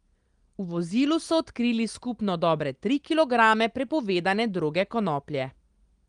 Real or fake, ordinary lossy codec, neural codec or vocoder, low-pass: real; Opus, 24 kbps; none; 9.9 kHz